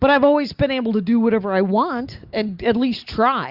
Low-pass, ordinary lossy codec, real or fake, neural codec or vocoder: 5.4 kHz; Opus, 64 kbps; real; none